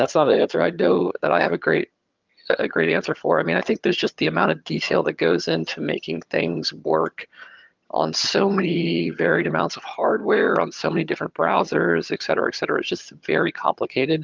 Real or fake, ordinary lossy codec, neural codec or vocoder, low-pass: fake; Opus, 24 kbps; vocoder, 22.05 kHz, 80 mel bands, HiFi-GAN; 7.2 kHz